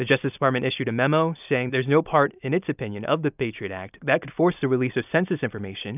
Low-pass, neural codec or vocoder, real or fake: 3.6 kHz; none; real